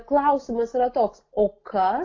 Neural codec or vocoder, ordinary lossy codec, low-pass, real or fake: none; AAC, 32 kbps; 7.2 kHz; real